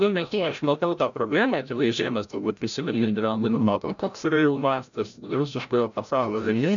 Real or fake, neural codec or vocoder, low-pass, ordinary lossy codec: fake; codec, 16 kHz, 0.5 kbps, FreqCodec, larger model; 7.2 kHz; MP3, 96 kbps